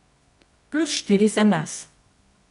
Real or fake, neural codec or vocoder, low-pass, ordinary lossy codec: fake; codec, 24 kHz, 0.9 kbps, WavTokenizer, medium music audio release; 10.8 kHz; none